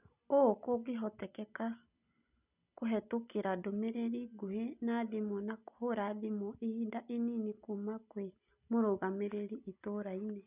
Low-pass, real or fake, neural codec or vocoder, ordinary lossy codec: 3.6 kHz; real; none; none